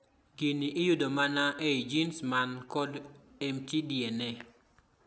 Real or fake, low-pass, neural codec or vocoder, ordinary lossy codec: real; none; none; none